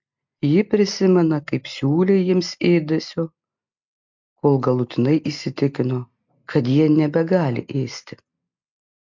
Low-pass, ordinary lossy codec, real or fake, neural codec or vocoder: 7.2 kHz; MP3, 64 kbps; real; none